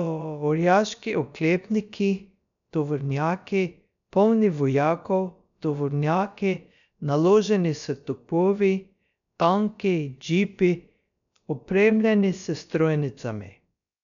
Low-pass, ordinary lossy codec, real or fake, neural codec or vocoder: 7.2 kHz; none; fake; codec, 16 kHz, about 1 kbps, DyCAST, with the encoder's durations